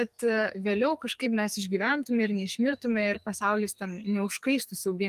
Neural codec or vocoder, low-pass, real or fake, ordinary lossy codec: codec, 44.1 kHz, 2.6 kbps, SNAC; 14.4 kHz; fake; Opus, 32 kbps